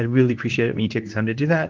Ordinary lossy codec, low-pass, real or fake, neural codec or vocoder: Opus, 32 kbps; 7.2 kHz; fake; codec, 24 kHz, 0.9 kbps, WavTokenizer, medium speech release version 2